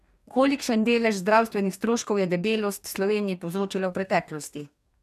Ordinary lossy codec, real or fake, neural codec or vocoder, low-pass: none; fake; codec, 44.1 kHz, 2.6 kbps, DAC; 14.4 kHz